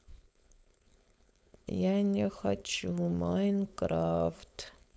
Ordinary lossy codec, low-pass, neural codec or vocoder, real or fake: none; none; codec, 16 kHz, 4.8 kbps, FACodec; fake